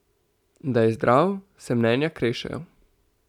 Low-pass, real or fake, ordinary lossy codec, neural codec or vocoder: 19.8 kHz; real; none; none